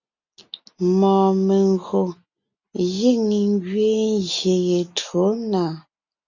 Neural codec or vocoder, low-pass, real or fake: none; 7.2 kHz; real